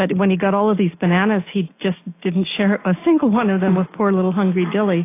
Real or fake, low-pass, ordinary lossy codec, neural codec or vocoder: real; 3.6 kHz; AAC, 24 kbps; none